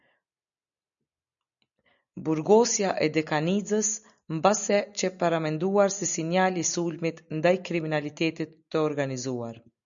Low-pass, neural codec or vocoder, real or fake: 7.2 kHz; none; real